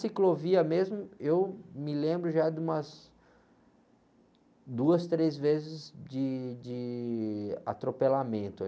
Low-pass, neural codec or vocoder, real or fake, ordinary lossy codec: none; none; real; none